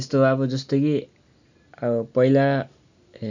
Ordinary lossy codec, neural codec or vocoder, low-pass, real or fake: none; none; 7.2 kHz; real